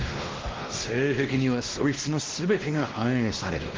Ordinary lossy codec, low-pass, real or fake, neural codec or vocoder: Opus, 16 kbps; 7.2 kHz; fake; codec, 16 kHz, 1 kbps, X-Codec, WavLM features, trained on Multilingual LibriSpeech